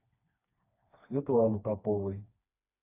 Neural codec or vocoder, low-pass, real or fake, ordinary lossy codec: codec, 16 kHz, 2 kbps, FreqCodec, smaller model; 3.6 kHz; fake; AAC, 24 kbps